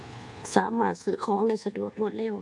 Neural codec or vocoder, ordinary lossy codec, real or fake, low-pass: codec, 24 kHz, 1.2 kbps, DualCodec; AAC, 64 kbps; fake; 10.8 kHz